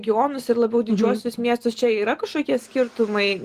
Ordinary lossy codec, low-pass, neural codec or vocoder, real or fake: Opus, 24 kbps; 14.4 kHz; none; real